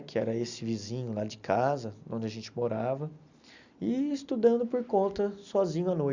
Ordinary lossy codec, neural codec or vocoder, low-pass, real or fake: Opus, 64 kbps; none; 7.2 kHz; real